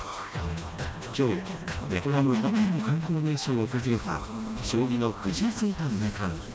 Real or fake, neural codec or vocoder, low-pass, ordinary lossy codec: fake; codec, 16 kHz, 1 kbps, FreqCodec, smaller model; none; none